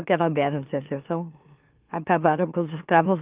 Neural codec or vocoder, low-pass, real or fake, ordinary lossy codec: autoencoder, 44.1 kHz, a latent of 192 numbers a frame, MeloTTS; 3.6 kHz; fake; Opus, 24 kbps